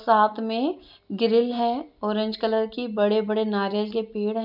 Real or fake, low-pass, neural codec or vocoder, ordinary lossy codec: real; 5.4 kHz; none; none